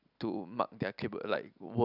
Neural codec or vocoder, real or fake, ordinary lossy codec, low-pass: none; real; none; 5.4 kHz